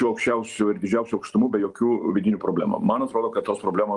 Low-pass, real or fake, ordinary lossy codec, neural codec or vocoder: 10.8 kHz; real; Opus, 24 kbps; none